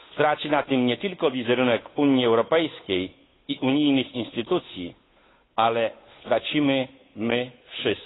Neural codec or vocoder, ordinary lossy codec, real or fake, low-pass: codec, 16 kHz, 8 kbps, FunCodec, trained on Chinese and English, 25 frames a second; AAC, 16 kbps; fake; 7.2 kHz